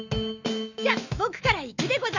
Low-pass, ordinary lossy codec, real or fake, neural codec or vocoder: 7.2 kHz; none; fake; autoencoder, 48 kHz, 128 numbers a frame, DAC-VAE, trained on Japanese speech